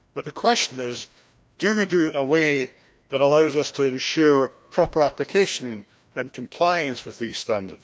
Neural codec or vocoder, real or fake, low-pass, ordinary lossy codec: codec, 16 kHz, 1 kbps, FreqCodec, larger model; fake; none; none